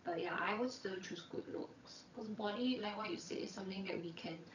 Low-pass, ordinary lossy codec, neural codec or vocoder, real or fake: 7.2 kHz; none; vocoder, 22.05 kHz, 80 mel bands, HiFi-GAN; fake